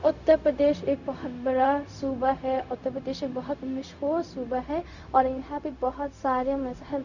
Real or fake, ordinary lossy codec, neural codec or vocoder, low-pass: fake; none; codec, 16 kHz, 0.4 kbps, LongCat-Audio-Codec; 7.2 kHz